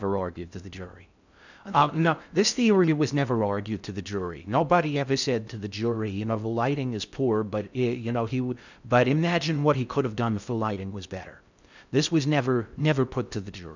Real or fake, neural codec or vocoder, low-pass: fake; codec, 16 kHz in and 24 kHz out, 0.6 kbps, FocalCodec, streaming, 2048 codes; 7.2 kHz